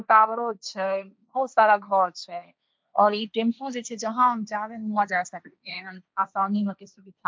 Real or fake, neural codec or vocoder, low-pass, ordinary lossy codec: fake; codec, 16 kHz, 1.1 kbps, Voila-Tokenizer; none; none